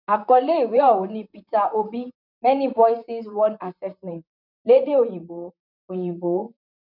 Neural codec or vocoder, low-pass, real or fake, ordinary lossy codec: vocoder, 44.1 kHz, 128 mel bands, Pupu-Vocoder; 5.4 kHz; fake; none